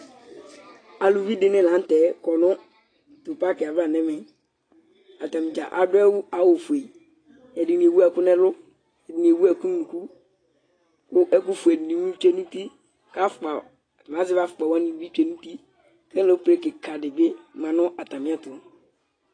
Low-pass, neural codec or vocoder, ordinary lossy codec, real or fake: 9.9 kHz; none; AAC, 32 kbps; real